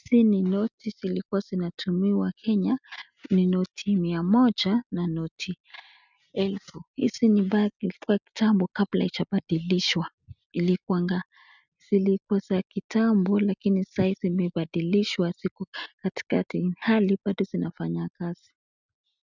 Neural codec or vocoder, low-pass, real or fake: none; 7.2 kHz; real